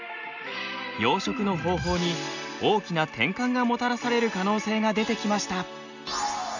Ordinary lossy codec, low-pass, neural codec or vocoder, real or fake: none; 7.2 kHz; none; real